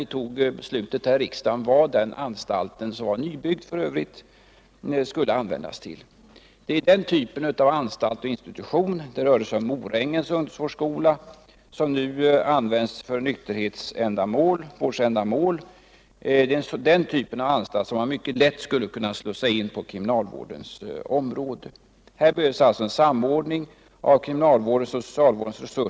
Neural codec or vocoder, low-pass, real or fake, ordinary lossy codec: none; none; real; none